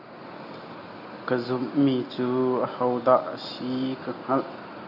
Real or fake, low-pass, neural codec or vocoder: real; 5.4 kHz; none